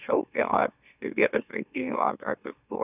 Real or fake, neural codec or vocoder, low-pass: fake; autoencoder, 44.1 kHz, a latent of 192 numbers a frame, MeloTTS; 3.6 kHz